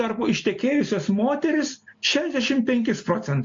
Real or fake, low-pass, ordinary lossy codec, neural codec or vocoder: real; 7.2 kHz; MP3, 48 kbps; none